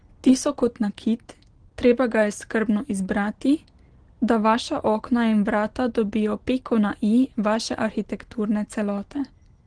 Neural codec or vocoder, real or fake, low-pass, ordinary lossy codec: none; real; 9.9 kHz; Opus, 16 kbps